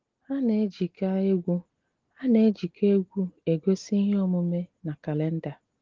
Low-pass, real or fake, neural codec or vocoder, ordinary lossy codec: 7.2 kHz; real; none; Opus, 16 kbps